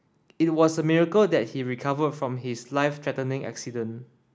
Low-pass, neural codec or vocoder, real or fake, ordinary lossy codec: none; none; real; none